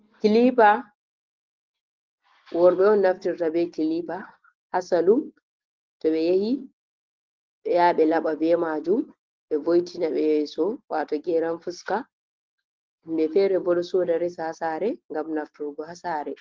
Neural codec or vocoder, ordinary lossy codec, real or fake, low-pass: none; Opus, 16 kbps; real; 7.2 kHz